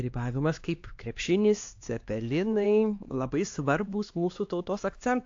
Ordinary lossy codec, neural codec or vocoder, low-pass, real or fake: AAC, 48 kbps; codec, 16 kHz, 2 kbps, X-Codec, HuBERT features, trained on LibriSpeech; 7.2 kHz; fake